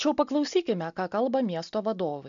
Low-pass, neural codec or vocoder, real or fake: 7.2 kHz; none; real